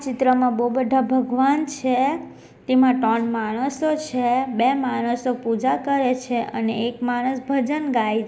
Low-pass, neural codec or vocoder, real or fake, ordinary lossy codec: none; none; real; none